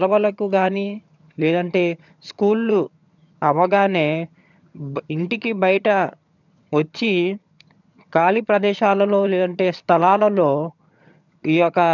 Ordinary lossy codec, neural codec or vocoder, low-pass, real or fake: none; vocoder, 22.05 kHz, 80 mel bands, HiFi-GAN; 7.2 kHz; fake